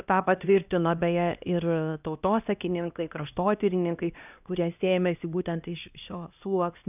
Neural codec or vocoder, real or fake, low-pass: codec, 16 kHz, 1 kbps, X-Codec, HuBERT features, trained on LibriSpeech; fake; 3.6 kHz